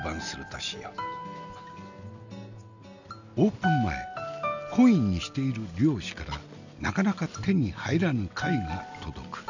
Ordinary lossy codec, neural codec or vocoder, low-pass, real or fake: none; none; 7.2 kHz; real